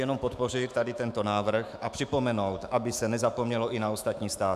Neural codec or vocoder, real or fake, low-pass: autoencoder, 48 kHz, 128 numbers a frame, DAC-VAE, trained on Japanese speech; fake; 14.4 kHz